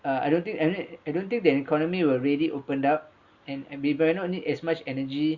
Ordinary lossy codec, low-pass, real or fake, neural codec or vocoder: Opus, 64 kbps; 7.2 kHz; real; none